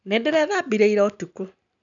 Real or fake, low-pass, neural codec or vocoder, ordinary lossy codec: real; 7.2 kHz; none; none